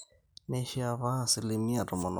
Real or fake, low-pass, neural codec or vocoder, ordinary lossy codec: real; none; none; none